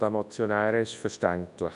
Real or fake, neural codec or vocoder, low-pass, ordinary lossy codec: fake; codec, 24 kHz, 0.9 kbps, WavTokenizer, large speech release; 10.8 kHz; none